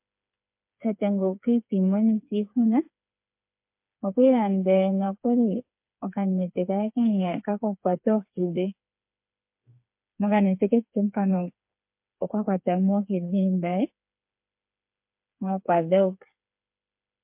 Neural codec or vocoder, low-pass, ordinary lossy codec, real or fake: codec, 16 kHz, 4 kbps, FreqCodec, smaller model; 3.6 kHz; MP3, 32 kbps; fake